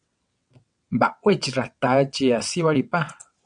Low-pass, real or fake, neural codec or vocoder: 9.9 kHz; fake; vocoder, 22.05 kHz, 80 mel bands, WaveNeXt